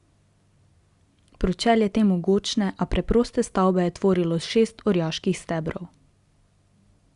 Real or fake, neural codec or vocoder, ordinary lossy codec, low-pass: real; none; Opus, 64 kbps; 10.8 kHz